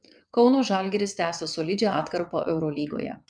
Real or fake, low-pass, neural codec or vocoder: fake; 9.9 kHz; vocoder, 22.05 kHz, 80 mel bands, WaveNeXt